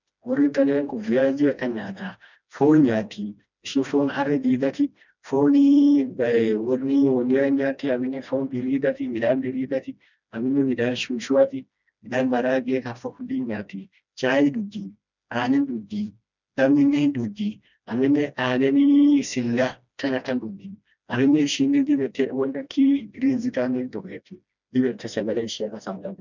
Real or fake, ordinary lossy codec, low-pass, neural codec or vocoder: fake; none; 7.2 kHz; codec, 16 kHz, 1 kbps, FreqCodec, smaller model